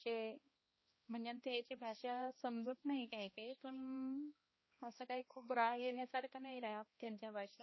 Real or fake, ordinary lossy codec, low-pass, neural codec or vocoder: fake; MP3, 24 kbps; 7.2 kHz; codec, 16 kHz, 1 kbps, X-Codec, HuBERT features, trained on balanced general audio